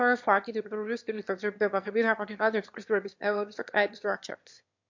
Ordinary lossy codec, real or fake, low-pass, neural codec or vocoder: MP3, 48 kbps; fake; 7.2 kHz; autoencoder, 22.05 kHz, a latent of 192 numbers a frame, VITS, trained on one speaker